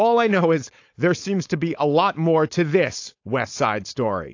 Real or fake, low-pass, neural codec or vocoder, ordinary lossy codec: fake; 7.2 kHz; codec, 16 kHz, 4.8 kbps, FACodec; AAC, 48 kbps